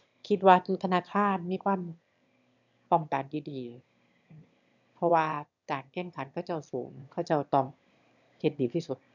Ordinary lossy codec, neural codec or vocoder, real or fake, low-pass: none; autoencoder, 22.05 kHz, a latent of 192 numbers a frame, VITS, trained on one speaker; fake; 7.2 kHz